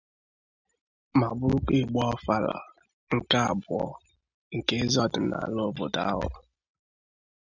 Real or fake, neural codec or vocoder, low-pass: real; none; 7.2 kHz